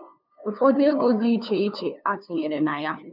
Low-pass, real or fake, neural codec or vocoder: 5.4 kHz; fake; codec, 16 kHz, 2 kbps, FunCodec, trained on LibriTTS, 25 frames a second